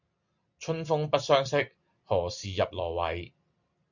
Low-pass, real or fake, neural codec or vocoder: 7.2 kHz; real; none